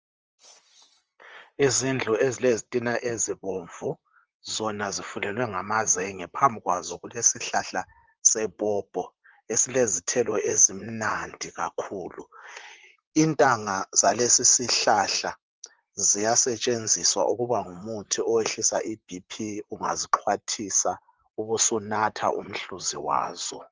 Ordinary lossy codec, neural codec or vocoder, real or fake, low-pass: Opus, 24 kbps; vocoder, 44.1 kHz, 128 mel bands, Pupu-Vocoder; fake; 7.2 kHz